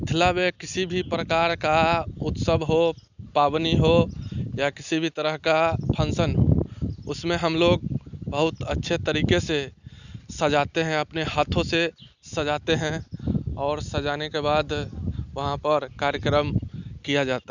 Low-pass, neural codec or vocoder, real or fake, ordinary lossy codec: 7.2 kHz; none; real; none